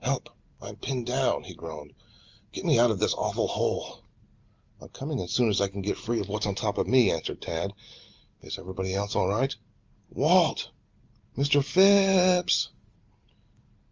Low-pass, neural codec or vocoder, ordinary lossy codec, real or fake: 7.2 kHz; none; Opus, 32 kbps; real